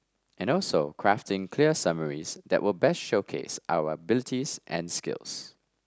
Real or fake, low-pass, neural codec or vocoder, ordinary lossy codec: real; none; none; none